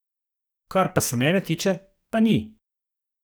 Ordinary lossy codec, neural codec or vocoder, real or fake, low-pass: none; codec, 44.1 kHz, 2.6 kbps, SNAC; fake; none